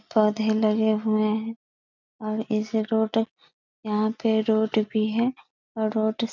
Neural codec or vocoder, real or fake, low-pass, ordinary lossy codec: none; real; 7.2 kHz; none